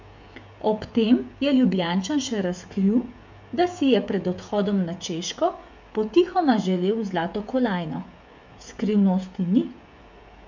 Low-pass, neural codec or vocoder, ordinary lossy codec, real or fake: 7.2 kHz; codec, 16 kHz, 16 kbps, FreqCodec, smaller model; MP3, 64 kbps; fake